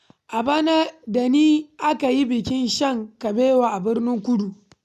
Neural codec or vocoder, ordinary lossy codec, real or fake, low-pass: none; none; real; 14.4 kHz